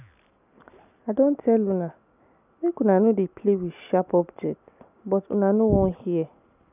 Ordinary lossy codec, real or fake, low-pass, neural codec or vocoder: none; real; 3.6 kHz; none